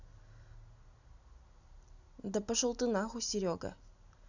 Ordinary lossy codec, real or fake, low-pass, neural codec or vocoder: none; real; 7.2 kHz; none